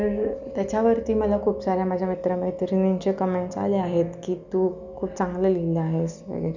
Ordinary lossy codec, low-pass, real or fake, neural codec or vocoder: none; 7.2 kHz; real; none